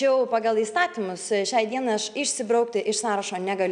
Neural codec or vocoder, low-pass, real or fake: none; 9.9 kHz; real